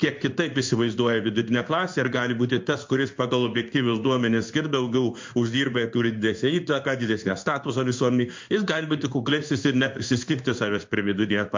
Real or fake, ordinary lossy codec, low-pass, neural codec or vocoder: fake; MP3, 48 kbps; 7.2 kHz; codec, 16 kHz in and 24 kHz out, 1 kbps, XY-Tokenizer